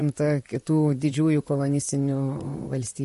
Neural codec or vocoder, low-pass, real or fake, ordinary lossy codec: vocoder, 44.1 kHz, 128 mel bands, Pupu-Vocoder; 14.4 kHz; fake; MP3, 48 kbps